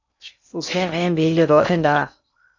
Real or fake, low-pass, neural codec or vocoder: fake; 7.2 kHz; codec, 16 kHz in and 24 kHz out, 0.6 kbps, FocalCodec, streaming, 2048 codes